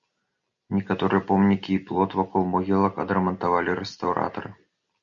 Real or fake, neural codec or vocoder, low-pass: real; none; 7.2 kHz